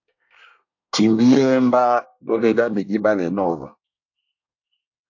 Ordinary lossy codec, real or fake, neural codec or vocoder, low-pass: AAC, 48 kbps; fake; codec, 24 kHz, 1 kbps, SNAC; 7.2 kHz